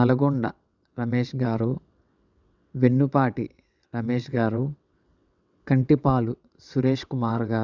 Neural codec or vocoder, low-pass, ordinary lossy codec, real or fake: vocoder, 22.05 kHz, 80 mel bands, WaveNeXt; 7.2 kHz; none; fake